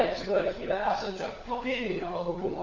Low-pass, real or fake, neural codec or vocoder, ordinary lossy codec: 7.2 kHz; fake; codec, 16 kHz, 4 kbps, FunCodec, trained on LibriTTS, 50 frames a second; AAC, 48 kbps